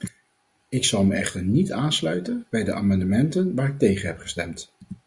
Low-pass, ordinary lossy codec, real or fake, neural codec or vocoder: 10.8 kHz; Opus, 64 kbps; real; none